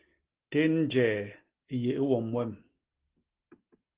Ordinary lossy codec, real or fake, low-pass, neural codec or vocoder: Opus, 16 kbps; real; 3.6 kHz; none